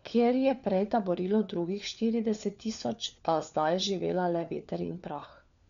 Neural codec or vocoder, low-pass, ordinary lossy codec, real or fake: codec, 16 kHz, 4 kbps, FunCodec, trained on LibriTTS, 50 frames a second; 7.2 kHz; none; fake